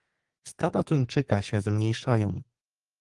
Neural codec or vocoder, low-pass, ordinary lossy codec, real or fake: codec, 32 kHz, 1.9 kbps, SNAC; 10.8 kHz; Opus, 24 kbps; fake